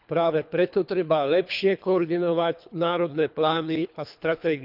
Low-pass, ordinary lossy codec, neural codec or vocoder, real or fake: 5.4 kHz; none; codec, 24 kHz, 3 kbps, HILCodec; fake